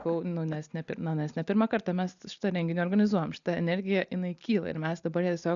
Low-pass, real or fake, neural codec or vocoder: 7.2 kHz; real; none